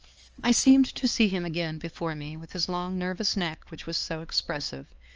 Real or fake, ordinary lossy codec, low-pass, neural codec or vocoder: fake; Opus, 24 kbps; 7.2 kHz; codec, 16 kHz, 4 kbps, X-Codec, HuBERT features, trained on LibriSpeech